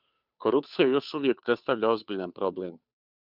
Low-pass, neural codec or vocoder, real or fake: 5.4 kHz; codec, 16 kHz, 2 kbps, FunCodec, trained on Chinese and English, 25 frames a second; fake